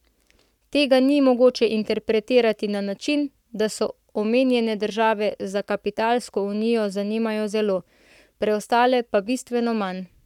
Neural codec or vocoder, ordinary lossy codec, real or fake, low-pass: codec, 44.1 kHz, 7.8 kbps, Pupu-Codec; none; fake; 19.8 kHz